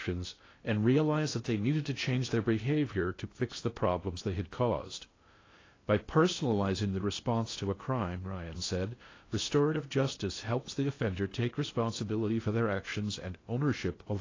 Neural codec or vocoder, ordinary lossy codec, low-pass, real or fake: codec, 16 kHz in and 24 kHz out, 0.6 kbps, FocalCodec, streaming, 2048 codes; AAC, 32 kbps; 7.2 kHz; fake